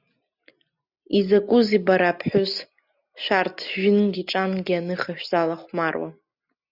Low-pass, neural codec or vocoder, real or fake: 5.4 kHz; none; real